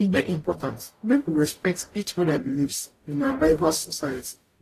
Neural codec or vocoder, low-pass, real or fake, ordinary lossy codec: codec, 44.1 kHz, 0.9 kbps, DAC; 14.4 kHz; fake; AAC, 48 kbps